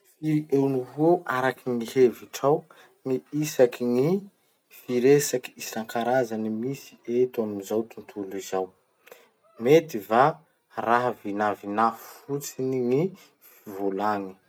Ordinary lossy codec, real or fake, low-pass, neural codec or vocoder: none; real; 19.8 kHz; none